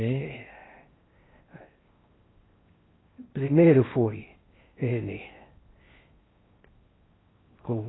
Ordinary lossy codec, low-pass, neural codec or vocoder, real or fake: AAC, 16 kbps; 7.2 kHz; codec, 16 kHz in and 24 kHz out, 0.6 kbps, FocalCodec, streaming, 4096 codes; fake